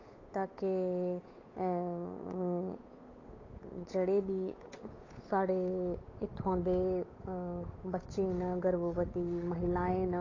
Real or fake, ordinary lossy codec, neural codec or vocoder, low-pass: fake; AAC, 32 kbps; codec, 16 kHz, 8 kbps, FunCodec, trained on Chinese and English, 25 frames a second; 7.2 kHz